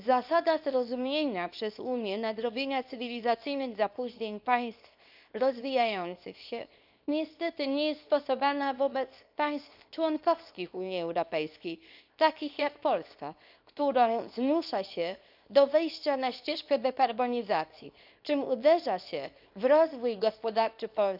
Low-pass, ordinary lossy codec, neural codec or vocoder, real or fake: 5.4 kHz; none; codec, 24 kHz, 0.9 kbps, WavTokenizer, small release; fake